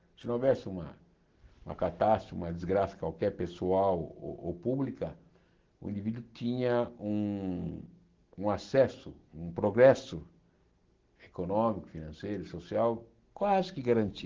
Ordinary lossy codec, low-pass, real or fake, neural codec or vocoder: Opus, 16 kbps; 7.2 kHz; real; none